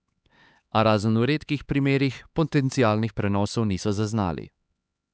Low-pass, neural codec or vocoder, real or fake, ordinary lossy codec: none; codec, 16 kHz, 4 kbps, X-Codec, HuBERT features, trained on LibriSpeech; fake; none